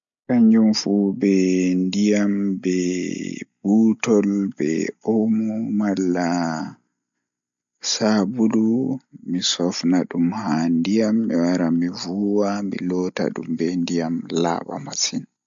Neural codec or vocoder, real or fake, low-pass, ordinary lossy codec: none; real; 7.2 kHz; MP3, 64 kbps